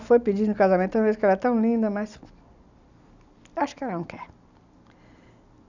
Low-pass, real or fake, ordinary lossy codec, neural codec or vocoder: 7.2 kHz; real; none; none